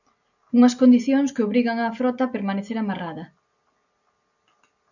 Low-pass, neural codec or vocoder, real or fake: 7.2 kHz; none; real